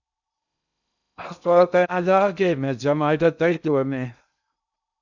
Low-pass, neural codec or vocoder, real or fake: 7.2 kHz; codec, 16 kHz in and 24 kHz out, 0.6 kbps, FocalCodec, streaming, 2048 codes; fake